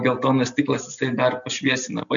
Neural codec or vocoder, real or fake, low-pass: none; real; 7.2 kHz